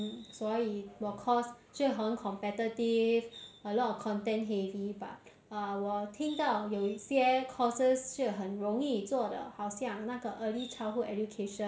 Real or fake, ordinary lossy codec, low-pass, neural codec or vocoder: real; none; none; none